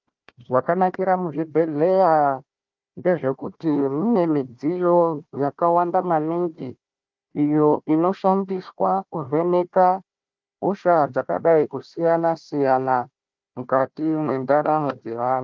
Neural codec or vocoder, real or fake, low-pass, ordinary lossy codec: codec, 16 kHz, 1 kbps, FunCodec, trained on Chinese and English, 50 frames a second; fake; 7.2 kHz; Opus, 24 kbps